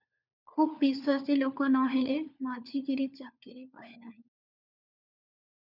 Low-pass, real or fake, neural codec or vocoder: 5.4 kHz; fake; codec, 16 kHz, 4 kbps, FunCodec, trained on LibriTTS, 50 frames a second